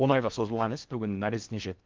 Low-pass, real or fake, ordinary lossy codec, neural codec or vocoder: 7.2 kHz; fake; Opus, 32 kbps; codec, 16 kHz in and 24 kHz out, 0.6 kbps, FocalCodec, streaming, 4096 codes